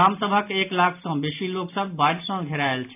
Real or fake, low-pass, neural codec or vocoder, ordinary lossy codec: real; 3.6 kHz; none; AAC, 32 kbps